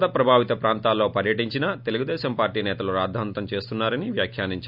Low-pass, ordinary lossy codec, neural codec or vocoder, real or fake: 5.4 kHz; none; none; real